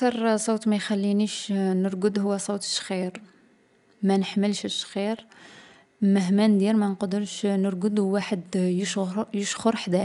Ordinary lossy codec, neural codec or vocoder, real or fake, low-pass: none; none; real; 10.8 kHz